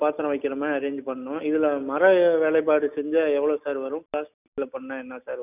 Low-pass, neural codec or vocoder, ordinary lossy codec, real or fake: 3.6 kHz; none; none; real